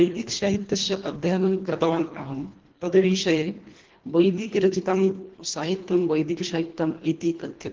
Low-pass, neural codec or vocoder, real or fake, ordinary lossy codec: 7.2 kHz; codec, 24 kHz, 1.5 kbps, HILCodec; fake; Opus, 16 kbps